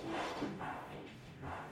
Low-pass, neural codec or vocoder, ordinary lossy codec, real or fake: 19.8 kHz; codec, 44.1 kHz, 0.9 kbps, DAC; MP3, 64 kbps; fake